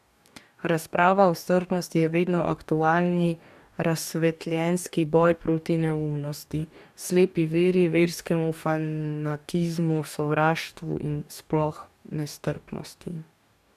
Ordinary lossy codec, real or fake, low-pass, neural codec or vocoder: none; fake; 14.4 kHz; codec, 44.1 kHz, 2.6 kbps, DAC